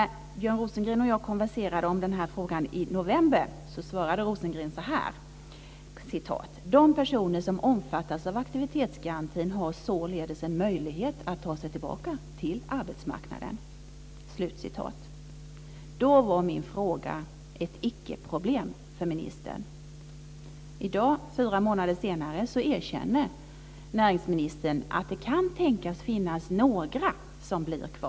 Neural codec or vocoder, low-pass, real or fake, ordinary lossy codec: none; none; real; none